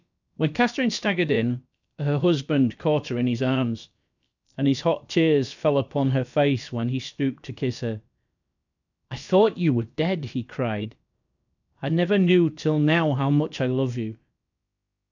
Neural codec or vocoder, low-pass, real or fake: codec, 16 kHz, about 1 kbps, DyCAST, with the encoder's durations; 7.2 kHz; fake